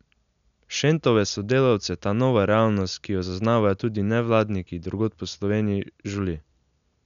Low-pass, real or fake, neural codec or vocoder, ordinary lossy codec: 7.2 kHz; real; none; none